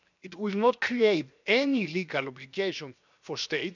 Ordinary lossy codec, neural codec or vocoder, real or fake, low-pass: none; codec, 16 kHz, 0.7 kbps, FocalCodec; fake; 7.2 kHz